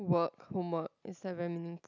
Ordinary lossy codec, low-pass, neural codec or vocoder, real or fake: none; 7.2 kHz; none; real